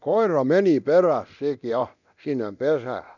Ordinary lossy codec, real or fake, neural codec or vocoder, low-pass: none; fake; codec, 24 kHz, 0.9 kbps, DualCodec; 7.2 kHz